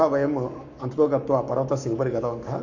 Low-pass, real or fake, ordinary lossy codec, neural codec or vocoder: 7.2 kHz; fake; none; codec, 44.1 kHz, 7.8 kbps, Pupu-Codec